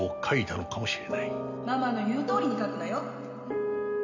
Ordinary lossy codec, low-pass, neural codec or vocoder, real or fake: none; 7.2 kHz; none; real